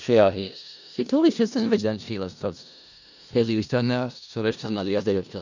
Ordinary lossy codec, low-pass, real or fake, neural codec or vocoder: none; 7.2 kHz; fake; codec, 16 kHz in and 24 kHz out, 0.4 kbps, LongCat-Audio-Codec, four codebook decoder